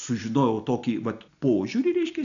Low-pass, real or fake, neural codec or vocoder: 7.2 kHz; real; none